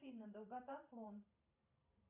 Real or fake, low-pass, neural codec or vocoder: fake; 3.6 kHz; vocoder, 44.1 kHz, 128 mel bands every 512 samples, BigVGAN v2